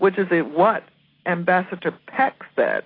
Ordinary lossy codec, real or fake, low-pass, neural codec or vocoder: AAC, 24 kbps; real; 5.4 kHz; none